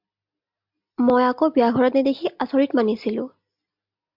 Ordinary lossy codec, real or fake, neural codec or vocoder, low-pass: MP3, 48 kbps; real; none; 5.4 kHz